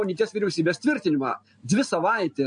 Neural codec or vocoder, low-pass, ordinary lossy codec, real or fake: none; 9.9 kHz; MP3, 48 kbps; real